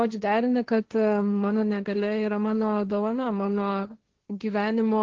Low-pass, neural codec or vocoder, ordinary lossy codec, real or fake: 7.2 kHz; codec, 16 kHz, 1.1 kbps, Voila-Tokenizer; Opus, 16 kbps; fake